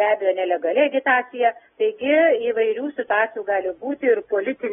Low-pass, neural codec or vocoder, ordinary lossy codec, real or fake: 19.8 kHz; none; AAC, 16 kbps; real